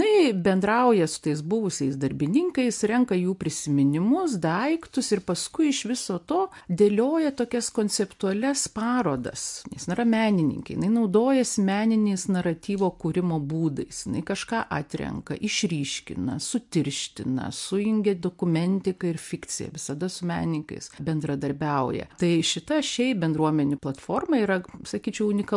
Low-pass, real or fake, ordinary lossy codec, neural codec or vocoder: 10.8 kHz; real; MP3, 64 kbps; none